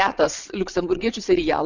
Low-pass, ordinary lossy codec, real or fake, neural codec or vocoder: 7.2 kHz; Opus, 64 kbps; fake; vocoder, 22.05 kHz, 80 mel bands, WaveNeXt